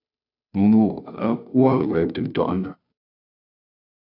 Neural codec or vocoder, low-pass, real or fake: codec, 16 kHz, 0.5 kbps, FunCodec, trained on Chinese and English, 25 frames a second; 5.4 kHz; fake